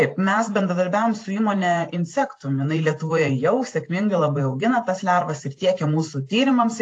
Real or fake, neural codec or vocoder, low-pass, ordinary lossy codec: fake; vocoder, 44.1 kHz, 128 mel bands every 512 samples, BigVGAN v2; 9.9 kHz; AAC, 48 kbps